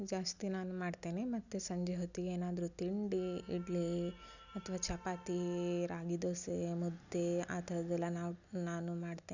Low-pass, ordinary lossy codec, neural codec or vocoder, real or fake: 7.2 kHz; none; none; real